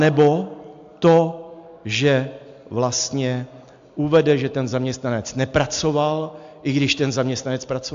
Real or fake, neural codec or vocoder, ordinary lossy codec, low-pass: real; none; AAC, 64 kbps; 7.2 kHz